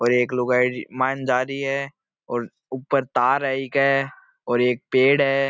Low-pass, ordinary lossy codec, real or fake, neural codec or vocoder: none; none; real; none